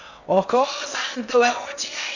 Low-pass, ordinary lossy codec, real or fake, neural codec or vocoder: 7.2 kHz; none; fake; codec, 16 kHz in and 24 kHz out, 0.6 kbps, FocalCodec, streaming, 4096 codes